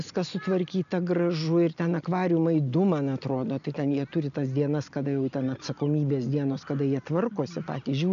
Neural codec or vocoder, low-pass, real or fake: none; 7.2 kHz; real